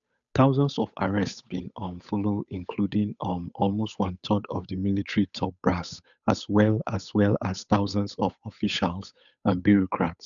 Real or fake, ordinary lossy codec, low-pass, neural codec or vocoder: fake; none; 7.2 kHz; codec, 16 kHz, 8 kbps, FunCodec, trained on Chinese and English, 25 frames a second